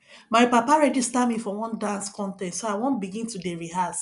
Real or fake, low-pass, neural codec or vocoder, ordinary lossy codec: real; 10.8 kHz; none; none